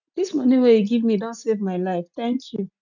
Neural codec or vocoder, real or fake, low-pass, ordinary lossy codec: none; real; 7.2 kHz; none